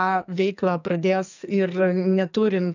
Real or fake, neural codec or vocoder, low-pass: fake; codec, 44.1 kHz, 2.6 kbps, SNAC; 7.2 kHz